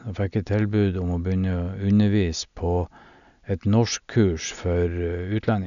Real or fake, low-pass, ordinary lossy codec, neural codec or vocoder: real; 7.2 kHz; none; none